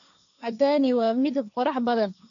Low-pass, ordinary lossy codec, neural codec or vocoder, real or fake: 7.2 kHz; none; codec, 16 kHz, 1.1 kbps, Voila-Tokenizer; fake